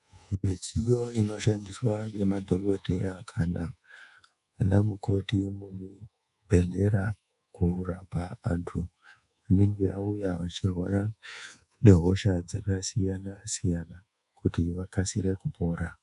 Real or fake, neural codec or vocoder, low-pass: fake; codec, 24 kHz, 1.2 kbps, DualCodec; 10.8 kHz